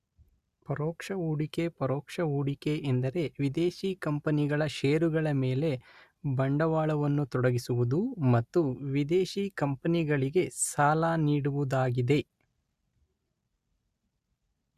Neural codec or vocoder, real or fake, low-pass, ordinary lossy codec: none; real; 14.4 kHz; Opus, 64 kbps